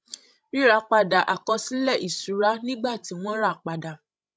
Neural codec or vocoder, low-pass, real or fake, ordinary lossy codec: codec, 16 kHz, 16 kbps, FreqCodec, larger model; none; fake; none